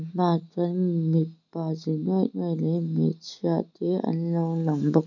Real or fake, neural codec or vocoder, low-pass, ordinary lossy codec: real; none; 7.2 kHz; none